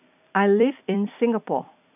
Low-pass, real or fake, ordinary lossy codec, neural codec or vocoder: 3.6 kHz; fake; none; vocoder, 44.1 kHz, 128 mel bands every 256 samples, BigVGAN v2